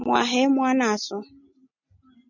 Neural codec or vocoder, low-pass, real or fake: none; 7.2 kHz; real